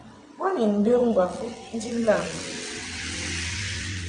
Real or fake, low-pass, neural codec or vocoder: fake; 9.9 kHz; vocoder, 22.05 kHz, 80 mel bands, WaveNeXt